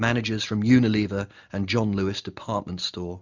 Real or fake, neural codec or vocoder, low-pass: real; none; 7.2 kHz